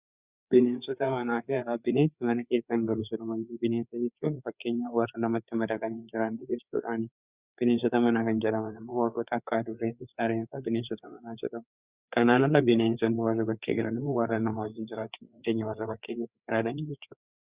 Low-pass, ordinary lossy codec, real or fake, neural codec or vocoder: 3.6 kHz; Opus, 64 kbps; fake; codec, 16 kHz, 4 kbps, FreqCodec, larger model